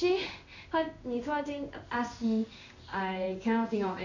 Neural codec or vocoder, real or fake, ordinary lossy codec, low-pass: codec, 16 kHz in and 24 kHz out, 1 kbps, XY-Tokenizer; fake; none; 7.2 kHz